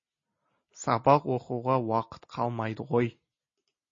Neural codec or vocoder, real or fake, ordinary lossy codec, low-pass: none; real; MP3, 32 kbps; 7.2 kHz